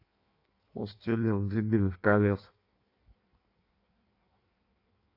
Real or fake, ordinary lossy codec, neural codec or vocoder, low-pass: fake; AAC, 32 kbps; codec, 16 kHz in and 24 kHz out, 1.1 kbps, FireRedTTS-2 codec; 5.4 kHz